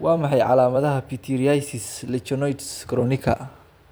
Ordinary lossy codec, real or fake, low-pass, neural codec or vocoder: none; fake; none; vocoder, 44.1 kHz, 128 mel bands every 256 samples, BigVGAN v2